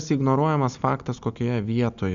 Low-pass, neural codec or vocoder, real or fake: 7.2 kHz; none; real